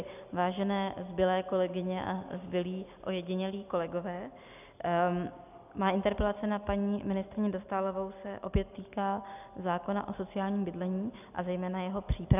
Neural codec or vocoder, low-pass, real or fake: none; 3.6 kHz; real